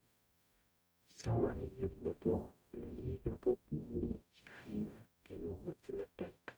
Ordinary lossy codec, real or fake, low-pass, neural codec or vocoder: none; fake; none; codec, 44.1 kHz, 0.9 kbps, DAC